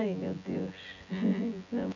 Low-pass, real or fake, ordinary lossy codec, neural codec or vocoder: 7.2 kHz; fake; none; vocoder, 24 kHz, 100 mel bands, Vocos